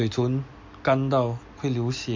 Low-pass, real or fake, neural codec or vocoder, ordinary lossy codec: 7.2 kHz; real; none; MP3, 48 kbps